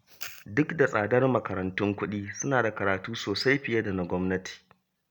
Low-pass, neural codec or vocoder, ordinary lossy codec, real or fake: none; none; none; real